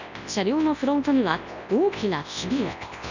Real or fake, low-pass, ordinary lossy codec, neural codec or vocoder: fake; 7.2 kHz; none; codec, 24 kHz, 0.9 kbps, WavTokenizer, large speech release